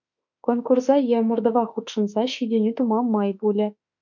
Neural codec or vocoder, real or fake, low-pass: autoencoder, 48 kHz, 32 numbers a frame, DAC-VAE, trained on Japanese speech; fake; 7.2 kHz